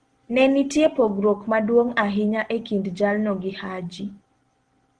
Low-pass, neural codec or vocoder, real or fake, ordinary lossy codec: 9.9 kHz; none; real; Opus, 16 kbps